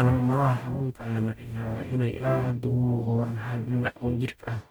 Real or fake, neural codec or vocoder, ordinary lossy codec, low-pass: fake; codec, 44.1 kHz, 0.9 kbps, DAC; none; none